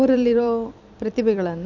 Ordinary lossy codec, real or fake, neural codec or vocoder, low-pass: none; fake; vocoder, 44.1 kHz, 128 mel bands every 256 samples, BigVGAN v2; 7.2 kHz